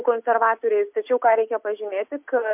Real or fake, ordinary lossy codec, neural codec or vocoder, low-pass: real; MP3, 32 kbps; none; 3.6 kHz